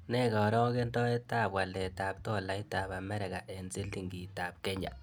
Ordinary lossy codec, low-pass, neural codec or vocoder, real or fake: none; none; none; real